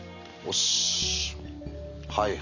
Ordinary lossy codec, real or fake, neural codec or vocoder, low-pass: none; real; none; 7.2 kHz